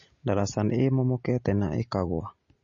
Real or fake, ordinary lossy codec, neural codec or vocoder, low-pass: real; MP3, 32 kbps; none; 7.2 kHz